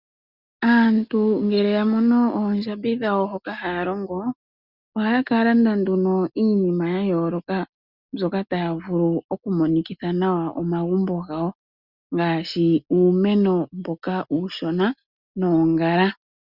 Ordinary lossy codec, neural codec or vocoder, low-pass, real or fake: Opus, 64 kbps; none; 5.4 kHz; real